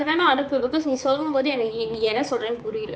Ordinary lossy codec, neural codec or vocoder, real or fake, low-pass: none; codec, 16 kHz, 4 kbps, X-Codec, HuBERT features, trained on balanced general audio; fake; none